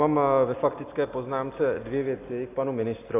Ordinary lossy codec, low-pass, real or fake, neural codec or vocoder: AAC, 24 kbps; 3.6 kHz; real; none